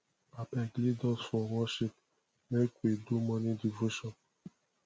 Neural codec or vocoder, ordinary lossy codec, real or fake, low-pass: none; none; real; none